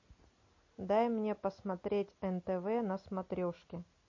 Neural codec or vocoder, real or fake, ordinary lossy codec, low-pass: none; real; MP3, 32 kbps; 7.2 kHz